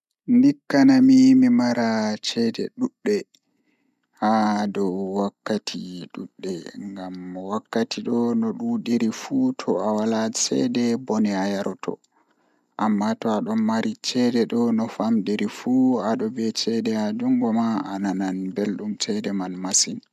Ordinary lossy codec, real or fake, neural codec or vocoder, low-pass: none; real; none; 14.4 kHz